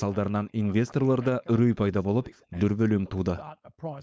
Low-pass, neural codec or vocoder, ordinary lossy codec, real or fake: none; codec, 16 kHz, 4.8 kbps, FACodec; none; fake